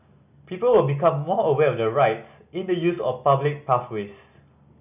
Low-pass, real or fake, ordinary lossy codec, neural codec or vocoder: 3.6 kHz; real; none; none